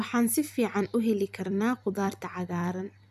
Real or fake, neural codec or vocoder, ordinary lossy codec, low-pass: fake; vocoder, 44.1 kHz, 128 mel bands every 256 samples, BigVGAN v2; none; 14.4 kHz